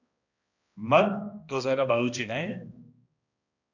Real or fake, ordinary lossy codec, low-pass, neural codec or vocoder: fake; AAC, 48 kbps; 7.2 kHz; codec, 16 kHz, 1 kbps, X-Codec, HuBERT features, trained on balanced general audio